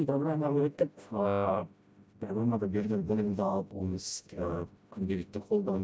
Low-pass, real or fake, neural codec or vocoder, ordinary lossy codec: none; fake; codec, 16 kHz, 0.5 kbps, FreqCodec, smaller model; none